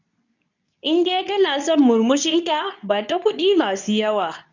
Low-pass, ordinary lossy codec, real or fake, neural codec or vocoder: 7.2 kHz; none; fake; codec, 24 kHz, 0.9 kbps, WavTokenizer, medium speech release version 2